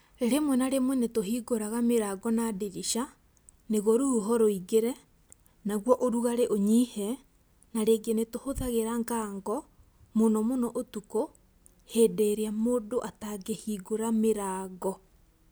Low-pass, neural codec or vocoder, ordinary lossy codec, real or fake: none; none; none; real